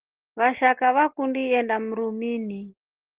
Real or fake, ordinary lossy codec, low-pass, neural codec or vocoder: real; Opus, 16 kbps; 3.6 kHz; none